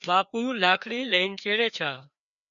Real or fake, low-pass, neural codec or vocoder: fake; 7.2 kHz; codec, 16 kHz, 2 kbps, FreqCodec, larger model